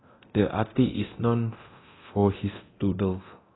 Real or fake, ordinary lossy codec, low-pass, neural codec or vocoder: fake; AAC, 16 kbps; 7.2 kHz; codec, 24 kHz, 0.9 kbps, DualCodec